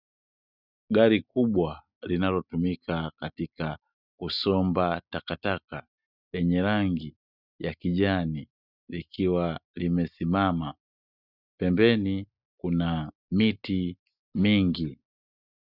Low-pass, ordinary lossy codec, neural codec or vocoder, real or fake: 5.4 kHz; Opus, 64 kbps; none; real